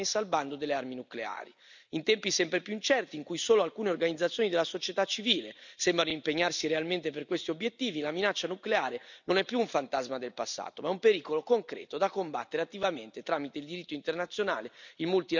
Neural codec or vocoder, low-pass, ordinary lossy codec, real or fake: none; 7.2 kHz; none; real